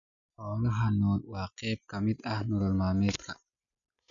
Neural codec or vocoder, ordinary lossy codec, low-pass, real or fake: none; none; 7.2 kHz; real